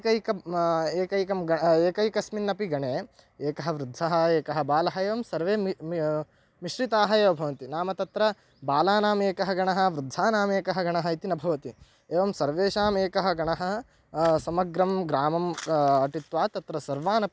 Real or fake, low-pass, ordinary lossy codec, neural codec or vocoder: real; none; none; none